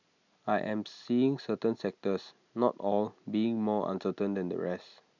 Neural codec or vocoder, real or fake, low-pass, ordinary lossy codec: none; real; 7.2 kHz; none